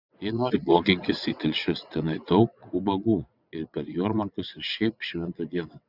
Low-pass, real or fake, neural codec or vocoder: 5.4 kHz; fake; vocoder, 22.05 kHz, 80 mel bands, WaveNeXt